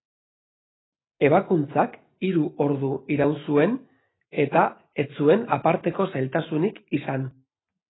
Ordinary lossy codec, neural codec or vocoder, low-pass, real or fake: AAC, 16 kbps; none; 7.2 kHz; real